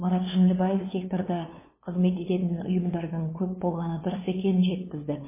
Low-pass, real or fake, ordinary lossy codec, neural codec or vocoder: 3.6 kHz; fake; MP3, 16 kbps; codec, 16 kHz, 2 kbps, FunCodec, trained on LibriTTS, 25 frames a second